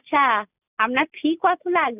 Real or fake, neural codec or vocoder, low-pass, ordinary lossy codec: real; none; 3.6 kHz; none